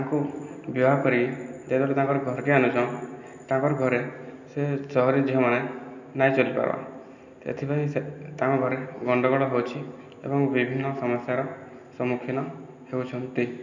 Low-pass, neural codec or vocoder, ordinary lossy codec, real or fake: 7.2 kHz; none; none; real